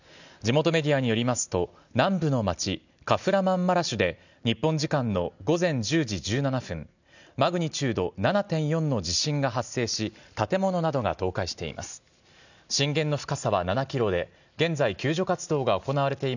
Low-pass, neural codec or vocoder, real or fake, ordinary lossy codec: 7.2 kHz; none; real; none